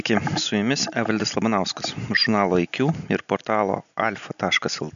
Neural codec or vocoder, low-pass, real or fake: none; 7.2 kHz; real